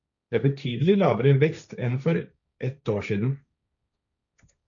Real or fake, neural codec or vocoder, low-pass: fake; codec, 16 kHz, 1.1 kbps, Voila-Tokenizer; 7.2 kHz